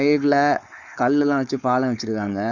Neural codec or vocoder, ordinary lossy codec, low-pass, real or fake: codec, 16 kHz, 4.8 kbps, FACodec; Opus, 64 kbps; 7.2 kHz; fake